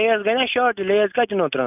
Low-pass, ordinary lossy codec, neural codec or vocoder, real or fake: 3.6 kHz; none; none; real